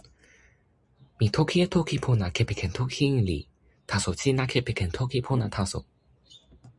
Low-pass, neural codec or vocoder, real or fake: 10.8 kHz; none; real